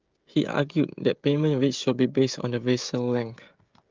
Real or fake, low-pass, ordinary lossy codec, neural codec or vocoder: fake; 7.2 kHz; Opus, 32 kbps; codec, 16 kHz, 16 kbps, FreqCodec, smaller model